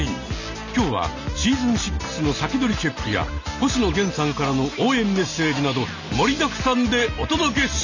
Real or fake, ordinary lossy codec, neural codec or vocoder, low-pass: real; none; none; 7.2 kHz